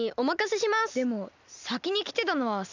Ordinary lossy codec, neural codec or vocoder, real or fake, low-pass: none; none; real; 7.2 kHz